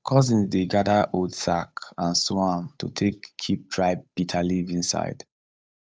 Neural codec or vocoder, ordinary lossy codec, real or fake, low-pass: codec, 16 kHz, 8 kbps, FunCodec, trained on Chinese and English, 25 frames a second; none; fake; none